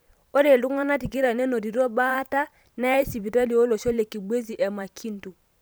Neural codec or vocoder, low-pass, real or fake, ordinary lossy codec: vocoder, 44.1 kHz, 128 mel bands every 512 samples, BigVGAN v2; none; fake; none